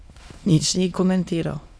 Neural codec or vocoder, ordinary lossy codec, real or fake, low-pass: autoencoder, 22.05 kHz, a latent of 192 numbers a frame, VITS, trained on many speakers; none; fake; none